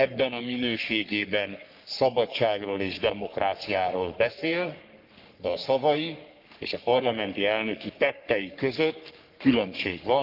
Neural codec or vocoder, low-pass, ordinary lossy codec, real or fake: codec, 44.1 kHz, 3.4 kbps, Pupu-Codec; 5.4 kHz; Opus, 24 kbps; fake